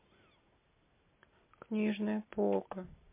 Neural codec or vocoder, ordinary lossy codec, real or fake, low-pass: none; MP3, 24 kbps; real; 3.6 kHz